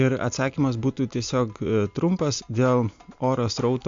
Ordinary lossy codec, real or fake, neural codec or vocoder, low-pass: AAC, 64 kbps; real; none; 7.2 kHz